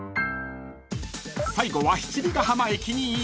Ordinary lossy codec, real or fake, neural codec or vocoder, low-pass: none; real; none; none